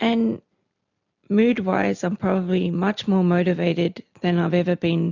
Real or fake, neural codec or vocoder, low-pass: real; none; 7.2 kHz